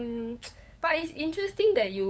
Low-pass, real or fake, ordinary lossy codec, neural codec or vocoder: none; fake; none; codec, 16 kHz, 8 kbps, FunCodec, trained on LibriTTS, 25 frames a second